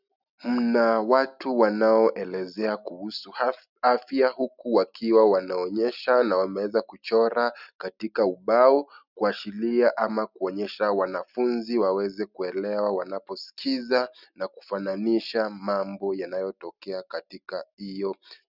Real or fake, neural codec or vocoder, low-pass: real; none; 5.4 kHz